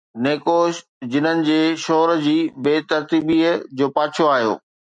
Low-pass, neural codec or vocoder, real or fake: 9.9 kHz; none; real